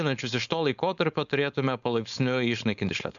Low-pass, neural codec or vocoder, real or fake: 7.2 kHz; codec, 16 kHz, 4.8 kbps, FACodec; fake